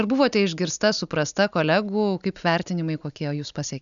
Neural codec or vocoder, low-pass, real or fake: none; 7.2 kHz; real